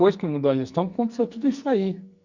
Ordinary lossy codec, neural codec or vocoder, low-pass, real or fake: Opus, 64 kbps; codec, 44.1 kHz, 2.6 kbps, SNAC; 7.2 kHz; fake